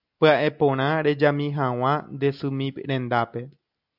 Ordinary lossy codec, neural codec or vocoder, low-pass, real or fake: AAC, 48 kbps; none; 5.4 kHz; real